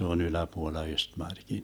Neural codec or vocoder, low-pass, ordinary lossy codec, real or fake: none; none; none; real